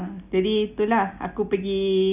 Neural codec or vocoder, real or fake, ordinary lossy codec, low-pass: none; real; none; 3.6 kHz